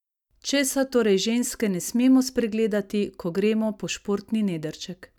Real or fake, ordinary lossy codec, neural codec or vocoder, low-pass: real; none; none; 19.8 kHz